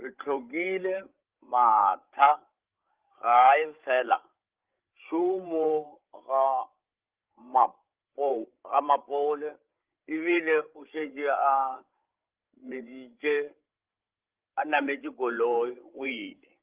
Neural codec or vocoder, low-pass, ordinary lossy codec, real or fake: codec, 16 kHz, 16 kbps, FreqCodec, larger model; 3.6 kHz; Opus, 16 kbps; fake